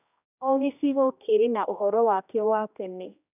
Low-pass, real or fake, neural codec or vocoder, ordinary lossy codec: 3.6 kHz; fake; codec, 16 kHz, 1 kbps, X-Codec, HuBERT features, trained on general audio; none